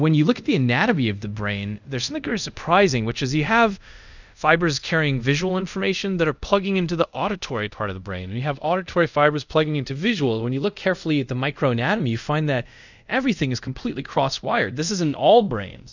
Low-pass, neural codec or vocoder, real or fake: 7.2 kHz; codec, 24 kHz, 0.5 kbps, DualCodec; fake